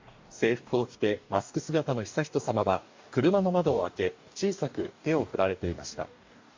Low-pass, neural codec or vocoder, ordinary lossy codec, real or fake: 7.2 kHz; codec, 44.1 kHz, 2.6 kbps, DAC; MP3, 48 kbps; fake